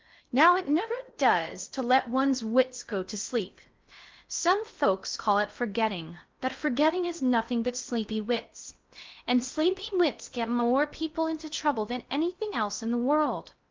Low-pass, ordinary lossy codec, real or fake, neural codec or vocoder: 7.2 kHz; Opus, 32 kbps; fake; codec, 16 kHz in and 24 kHz out, 0.6 kbps, FocalCodec, streaming, 4096 codes